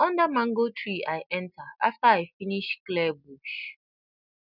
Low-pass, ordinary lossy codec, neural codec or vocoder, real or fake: 5.4 kHz; none; none; real